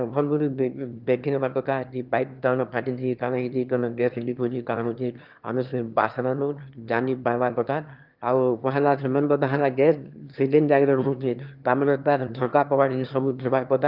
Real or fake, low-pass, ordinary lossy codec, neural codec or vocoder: fake; 5.4 kHz; Opus, 24 kbps; autoencoder, 22.05 kHz, a latent of 192 numbers a frame, VITS, trained on one speaker